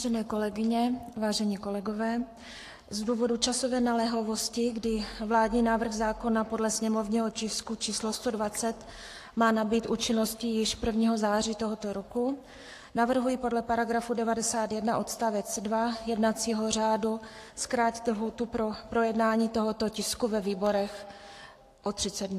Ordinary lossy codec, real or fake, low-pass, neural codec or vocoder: AAC, 64 kbps; fake; 14.4 kHz; codec, 44.1 kHz, 7.8 kbps, Pupu-Codec